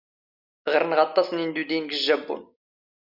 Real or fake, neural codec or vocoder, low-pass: real; none; 5.4 kHz